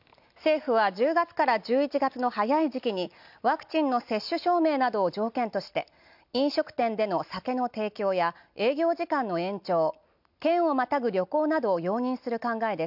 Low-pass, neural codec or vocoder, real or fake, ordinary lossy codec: 5.4 kHz; none; real; none